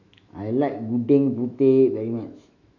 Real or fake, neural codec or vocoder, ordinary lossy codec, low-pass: fake; autoencoder, 48 kHz, 128 numbers a frame, DAC-VAE, trained on Japanese speech; none; 7.2 kHz